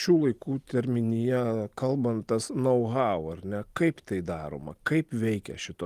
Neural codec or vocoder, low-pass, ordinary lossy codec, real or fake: none; 14.4 kHz; Opus, 24 kbps; real